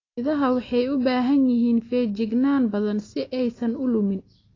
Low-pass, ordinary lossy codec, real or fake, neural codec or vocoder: 7.2 kHz; AAC, 32 kbps; real; none